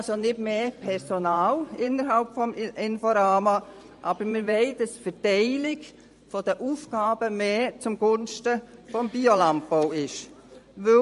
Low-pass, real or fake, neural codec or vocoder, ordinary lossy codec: 14.4 kHz; fake; vocoder, 44.1 kHz, 128 mel bands every 512 samples, BigVGAN v2; MP3, 48 kbps